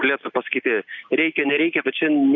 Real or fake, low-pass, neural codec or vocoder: real; 7.2 kHz; none